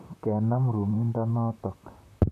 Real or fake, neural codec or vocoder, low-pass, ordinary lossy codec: fake; vocoder, 44.1 kHz, 128 mel bands, Pupu-Vocoder; 14.4 kHz; none